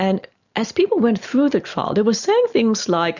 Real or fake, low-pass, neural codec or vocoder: real; 7.2 kHz; none